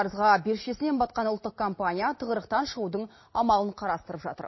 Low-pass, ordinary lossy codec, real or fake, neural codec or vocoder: 7.2 kHz; MP3, 24 kbps; real; none